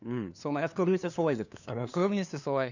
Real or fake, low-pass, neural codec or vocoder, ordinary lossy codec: fake; 7.2 kHz; codec, 16 kHz, 2 kbps, FunCodec, trained on LibriTTS, 25 frames a second; none